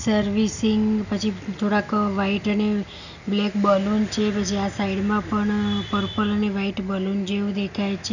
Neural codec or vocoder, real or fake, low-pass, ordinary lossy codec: none; real; 7.2 kHz; none